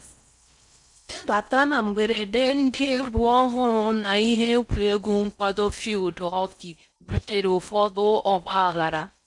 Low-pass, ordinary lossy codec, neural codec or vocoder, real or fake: 10.8 kHz; none; codec, 16 kHz in and 24 kHz out, 0.6 kbps, FocalCodec, streaming, 4096 codes; fake